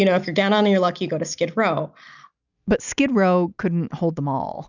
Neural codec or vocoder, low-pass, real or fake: none; 7.2 kHz; real